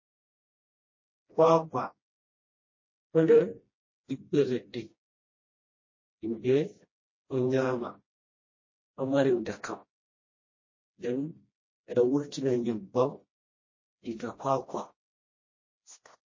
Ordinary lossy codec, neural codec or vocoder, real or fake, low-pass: MP3, 32 kbps; codec, 16 kHz, 1 kbps, FreqCodec, smaller model; fake; 7.2 kHz